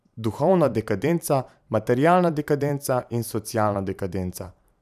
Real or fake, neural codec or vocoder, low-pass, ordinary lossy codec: fake; vocoder, 44.1 kHz, 128 mel bands every 512 samples, BigVGAN v2; 14.4 kHz; none